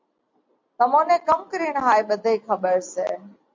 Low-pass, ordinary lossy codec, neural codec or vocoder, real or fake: 7.2 kHz; MP3, 48 kbps; none; real